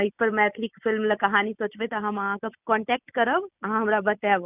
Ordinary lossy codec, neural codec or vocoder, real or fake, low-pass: none; none; real; 3.6 kHz